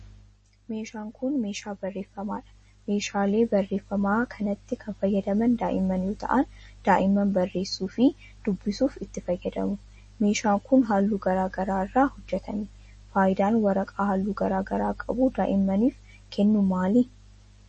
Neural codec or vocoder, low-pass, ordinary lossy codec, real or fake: none; 9.9 kHz; MP3, 32 kbps; real